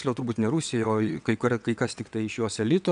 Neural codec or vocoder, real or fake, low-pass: vocoder, 22.05 kHz, 80 mel bands, WaveNeXt; fake; 9.9 kHz